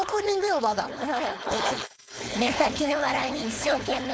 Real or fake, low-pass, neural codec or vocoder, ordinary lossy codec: fake; none; codec, 16 kHz, 4.8 kbps, FACodec; none